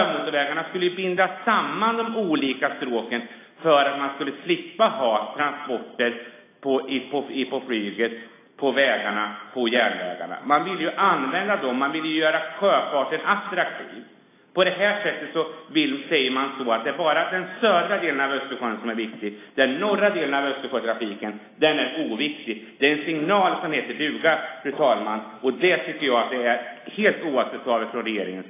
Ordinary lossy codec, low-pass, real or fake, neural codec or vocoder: AAC, 24 kbps; 3.6 kHz; real; none